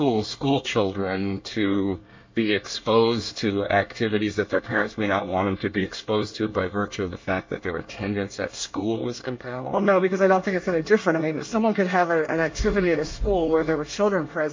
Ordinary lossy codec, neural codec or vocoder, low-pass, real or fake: MP3, 48 kbps; codec, 24 kHz, 1 kbps, SNAC; 7.2 kHz; fake